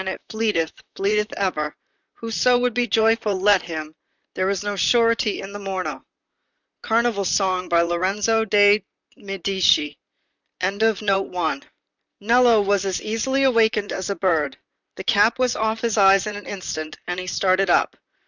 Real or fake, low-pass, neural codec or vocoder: fake; 7.2 kHz; vocoder, 44.1 kHz, 128 mel bands, Pupu-Vocoder